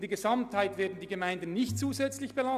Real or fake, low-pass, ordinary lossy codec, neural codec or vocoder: real; 14.4 kHz; none; none